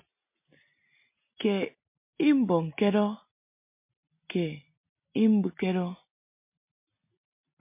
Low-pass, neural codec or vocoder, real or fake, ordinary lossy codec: 3.6 kHz; none; real; MP3, 24 kbps